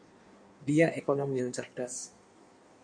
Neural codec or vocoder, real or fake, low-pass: codec, 16 kHz in and 24 kHz out, 1.1 kbps, FireRedTTS-2 codec; fake; 9.9 kHz